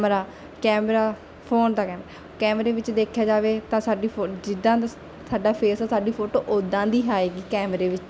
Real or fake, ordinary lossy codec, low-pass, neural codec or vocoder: real; none; none; none